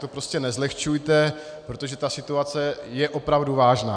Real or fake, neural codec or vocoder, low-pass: real; none; 9.9 kHz